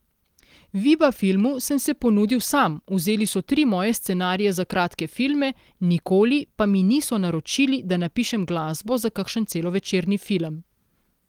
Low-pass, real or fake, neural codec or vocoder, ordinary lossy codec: 19.8 kHz; real; none; Opus, 24 kbps